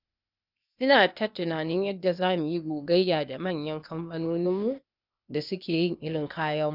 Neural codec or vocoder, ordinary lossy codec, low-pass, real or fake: codec, 16 kHz, 0.8 kbps, ZipCodec; none; 5.4 kHz; fake